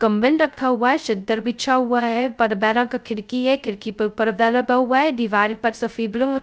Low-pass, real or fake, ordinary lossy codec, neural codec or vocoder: none; fake; none; codec, 16 kHz, 0.2 kbps, FocalCodec